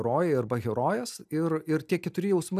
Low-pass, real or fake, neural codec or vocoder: 14.4 kHz; real; none